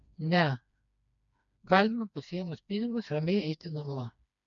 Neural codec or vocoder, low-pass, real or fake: codec, 16 kHz, 2 kbps, FreqCodec, smaller model; 7.2 kHz; fake